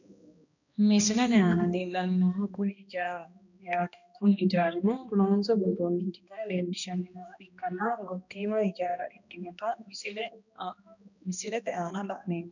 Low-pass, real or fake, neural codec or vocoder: 7.2 kHz; fake; codec, 16 kHz, 1 kbps, X-Codec, HuBERT features, trained on balanced general audio